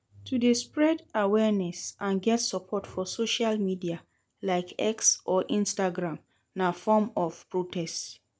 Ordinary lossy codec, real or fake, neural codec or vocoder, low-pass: none; real; none; none